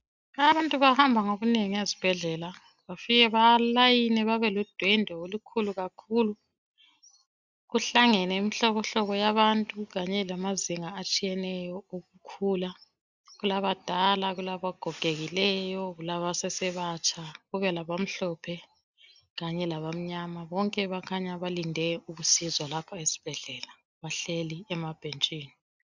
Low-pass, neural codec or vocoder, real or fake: 7.2 kHz; none; real